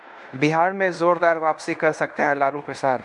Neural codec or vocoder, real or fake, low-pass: codec, 16 kHz in and 24 kHz out, 0.9 kbps, LongCat-Audio-Codec, fine tuned four codebook decoder; fake; 10.8 kHz